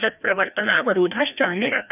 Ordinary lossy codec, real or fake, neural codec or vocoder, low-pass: none; fake; codec, 16 kHz, 1 kbps, FreqCodec, larger model; 3.6 kHz